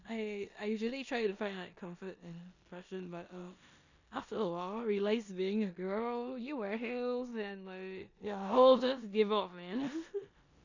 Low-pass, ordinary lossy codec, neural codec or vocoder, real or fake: 7.2 kHz; none; codec, 16 kHz in and 24 kHz out, 0.9 kbps, LongCat-Audio-Codec, four codebook decoder; fake